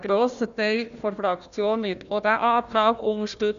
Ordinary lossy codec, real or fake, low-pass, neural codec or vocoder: none; fake; 7.2 kHz; codec, 16 kHz, 1 kbps, FunCodec, trained on Chinese and English, 50 frames a second